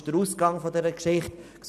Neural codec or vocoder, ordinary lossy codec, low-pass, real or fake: none; none; 14.4 kHz; real